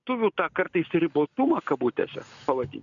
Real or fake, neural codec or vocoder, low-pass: real; none; 7.2 kHz